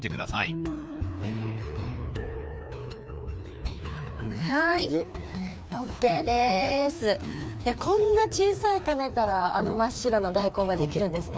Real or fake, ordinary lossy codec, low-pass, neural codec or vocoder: fake; none; none; codec, 16 kHz, 2 kbps, FreqCodec, larger model